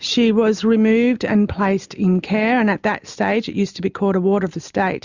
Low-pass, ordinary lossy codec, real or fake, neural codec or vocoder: 7.2 kHz; Opus, 64 kbps; real; none